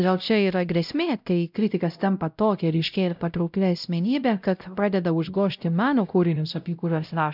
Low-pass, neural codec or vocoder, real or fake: 5.4 kHz; codec, 16 kHz, 0.5 kbps, X-Codec, WavLM features, trained on Multilingual LibriSpeech; fake